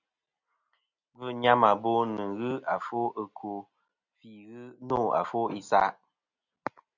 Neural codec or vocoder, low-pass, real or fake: none; 7.2 kHz; real